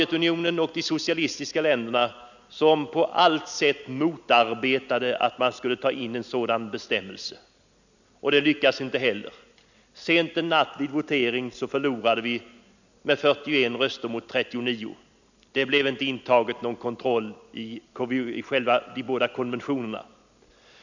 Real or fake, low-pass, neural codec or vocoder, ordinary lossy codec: real; 7.2 kHz; none; none